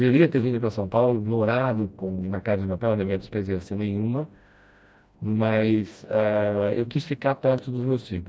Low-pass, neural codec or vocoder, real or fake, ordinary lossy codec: none; codec, 16 kHz, 1 kbps, FreqCodec, smaller model; fake; none